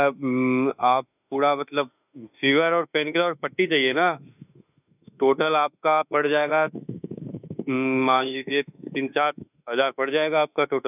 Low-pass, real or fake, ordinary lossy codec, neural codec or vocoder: 3.6 kHz; fake; none; autoencoder, 48 kHz, 32 numbers a frame, DAC-VAE, trained on Japanese speech